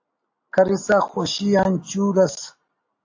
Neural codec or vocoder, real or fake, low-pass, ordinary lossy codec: none; real; 7.2 kHz; AAC, 48 kbps